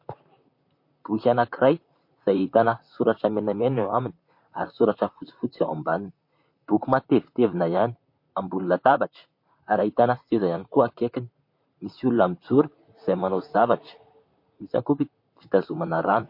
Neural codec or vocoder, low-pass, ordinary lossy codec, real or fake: vocoder, 44.1 kHz, 128 mel bands, Pupu-Vocoder; 5.4 kHz; MP3, 32 kbps; fake